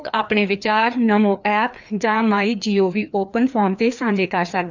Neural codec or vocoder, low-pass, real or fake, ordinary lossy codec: codec, 16 kHz, 2 kbps, FreqCodec, larger model; 7.2 kHz; fake; none